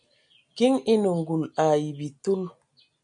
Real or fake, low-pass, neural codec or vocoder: real; 9.9 kHz; none